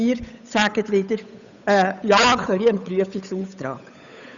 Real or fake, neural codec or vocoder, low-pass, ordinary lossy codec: fake; codec, 16 kHz, 8 kbps, FunCodec, trained on Chinese and English, 25 frames a second; 7.2 kHz; none